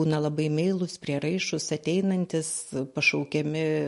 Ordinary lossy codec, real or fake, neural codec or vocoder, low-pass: MP3, 48 kbps; real; none; 14.4 kHz